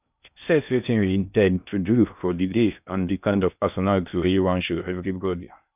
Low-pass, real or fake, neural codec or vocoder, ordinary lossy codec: 3.6 kHz; fake; codec, 16 kHz in and 24 kHz out, 0.6 kbps, FocalCodec, streaming, 2048 codes; none